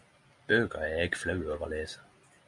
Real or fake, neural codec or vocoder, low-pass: real; none; 10.8 kHz